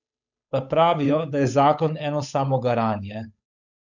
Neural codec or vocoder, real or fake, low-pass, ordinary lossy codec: codec, 16 kHz, 8 kbps, FunCodec, trained on Chinese and English, 25 frames a second; fake; 7.2 kHz; none